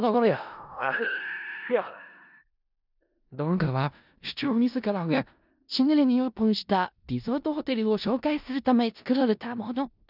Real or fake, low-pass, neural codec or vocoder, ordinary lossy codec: fake; 5.4 kHz; codec, 16 kHz in and 24 kHz out, 0.4 kbps, LongCat-Audio-Codec, four codebook decoder; none